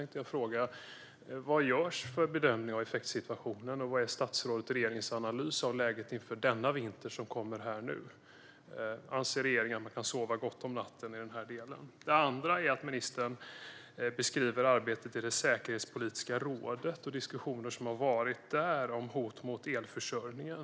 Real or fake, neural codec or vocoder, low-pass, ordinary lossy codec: real; none; none; none